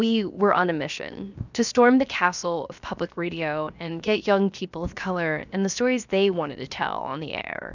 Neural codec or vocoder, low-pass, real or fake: codec, 16 kHz, 0.7 kbps, FocalCodec; 7.2 kHz; fake